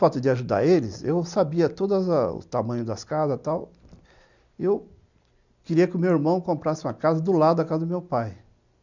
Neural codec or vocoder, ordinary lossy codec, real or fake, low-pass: none; none; real; 7.2 kHz